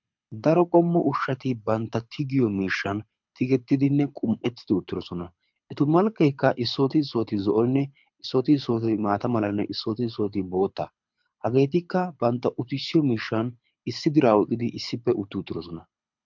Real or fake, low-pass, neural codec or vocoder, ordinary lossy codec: fake; 7.2 kHz; codec, 24 kHz, 6 kbps, HILCodec; MP3, 64 kbps